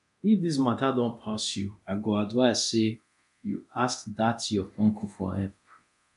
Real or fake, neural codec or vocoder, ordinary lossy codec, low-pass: fake; codec, 24 kHz, 0.9 kbps, DualCodec; none; 10.8 kHz